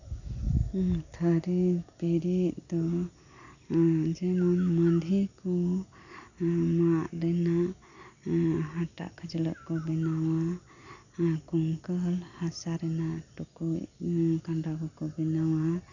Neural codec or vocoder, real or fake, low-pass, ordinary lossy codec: none; real; 7.2 kHz; none